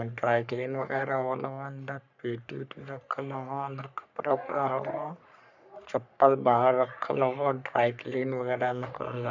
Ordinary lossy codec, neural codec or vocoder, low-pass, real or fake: none; codec, 44.1 kHz, 3.4 kbps, Pupu-Codec; 7.2 kHz; fake